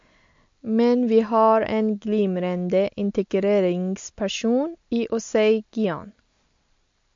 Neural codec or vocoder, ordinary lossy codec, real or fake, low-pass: none; MP3, 48 kbps; real; 7.2 kHz